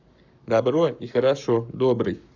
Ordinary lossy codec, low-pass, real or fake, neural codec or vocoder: none; 7.2 kHz; fake; codec, 44.1 kHz, 7.8 kbps, Pupu-Codec